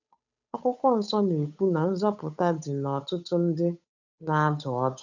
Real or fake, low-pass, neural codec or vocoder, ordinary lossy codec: fake; 7.2 kHz; codec, 16 kHz, 8 kbps, FunCodec, trained on Chinese and English, 25 frames a second; none